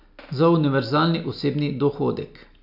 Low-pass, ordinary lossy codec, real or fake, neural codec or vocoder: 5.4 kHz; none; real; none